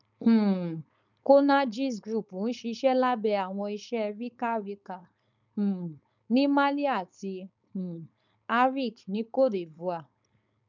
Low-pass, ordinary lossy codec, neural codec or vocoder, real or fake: 7.2 kHz; none; codec, 16 kHz, 4.8 kbps, FACodec; fake